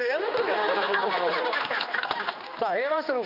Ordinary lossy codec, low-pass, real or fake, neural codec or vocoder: none; 5.4 kHz; fake; codec, 16 kHz, 2 kbps, X-Codec, HuBERT features, trained on general audio